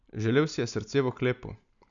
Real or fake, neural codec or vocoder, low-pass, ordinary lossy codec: real; none; 7.2 kHz; none